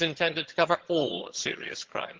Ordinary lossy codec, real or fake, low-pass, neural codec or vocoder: Opus, 16 kbps; fake; 7.2 kHz; vocoder, 22.05 kHz, 80 mel bands, HiFi-GAN